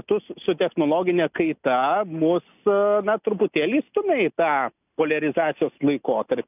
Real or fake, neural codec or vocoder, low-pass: real; none; 3.6 kHz